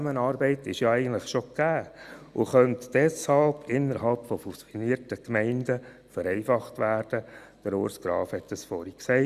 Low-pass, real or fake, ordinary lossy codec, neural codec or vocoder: 14.4 kHz; real; Opus, 64 kbps; none